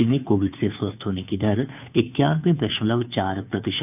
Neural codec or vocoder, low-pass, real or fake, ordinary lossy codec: codec, 16 kHz, 2 kbps, FunCodec, trained on Chinese and English, 25 frames a second; 3.6 kHz; fake; none